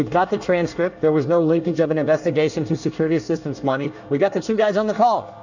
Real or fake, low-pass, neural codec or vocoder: fake; 7.2 kHz; codec, 24 kHz, 1 kbps, SNAC